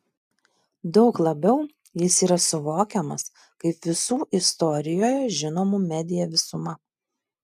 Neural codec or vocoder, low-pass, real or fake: none; 14.4 kHz; real